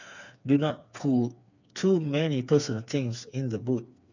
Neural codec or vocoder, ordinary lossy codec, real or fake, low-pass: codec, 16 kHz, 4 kbps, FreqCodec, smaller model; none; fake; 7.2 kHz